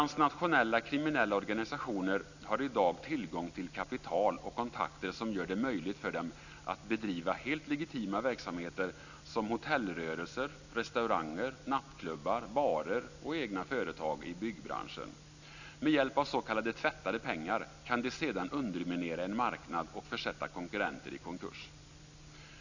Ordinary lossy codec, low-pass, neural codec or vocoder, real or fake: none; 7.2 kHz; none; real